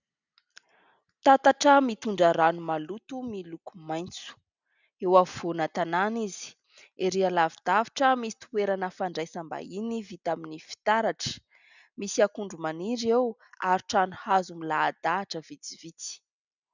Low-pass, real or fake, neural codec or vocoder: 7.2 kHz; real; none